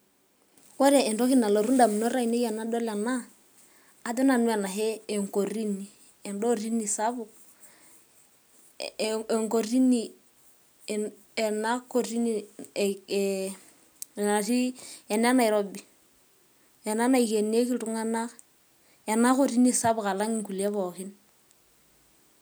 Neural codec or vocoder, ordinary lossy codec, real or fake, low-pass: none; none; real; none